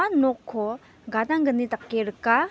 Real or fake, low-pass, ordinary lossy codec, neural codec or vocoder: real; none; none; none